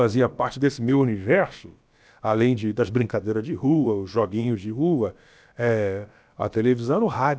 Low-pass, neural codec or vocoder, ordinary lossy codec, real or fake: none; codec, 16 kHz, about 1 kbps, DyCAST, with the encoder's durations; none; fake